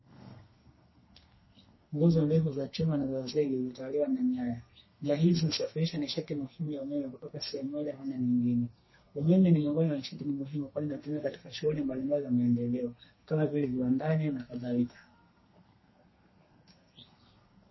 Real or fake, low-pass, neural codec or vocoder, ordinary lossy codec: fake; 7.2 kHz; codec, 32 kHz, 1.9 kbps, SNAC; MP3, 24 kbps